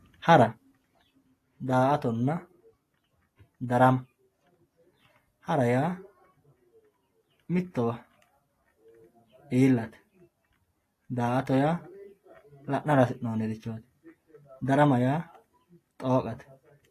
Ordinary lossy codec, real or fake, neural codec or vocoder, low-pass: AAC, 48 kbps; real; none; 14.4 kHz